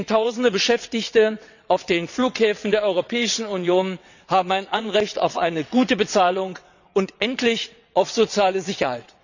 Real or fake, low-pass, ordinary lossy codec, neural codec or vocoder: fake; 7.2 kHz; none; vocoder, 22.05 kHz, 80 mel bands, WaveNeXt